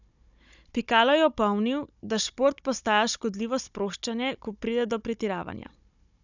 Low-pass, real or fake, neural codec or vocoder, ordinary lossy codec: 7.2 kHz; fake; codec, 16 kHz, 16 kbps, FunCodec, trained on Chinese and English, 50 frames a second; none